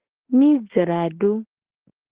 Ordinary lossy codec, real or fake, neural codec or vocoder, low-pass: Opus, 16 kbps; fake; autoencoder, 48 kHz, 32 numbers a frame, DAC-VAE, trained on Japanese speech; 3.6 kHz